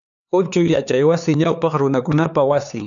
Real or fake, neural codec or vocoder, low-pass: fake; codec, 16 kHz, 4 kbps, X-Codec, HuBERT features, trained on LibriSpeech; 7.2 kHz